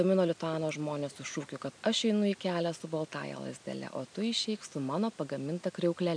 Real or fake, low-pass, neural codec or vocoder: real; 9.9 kHz; none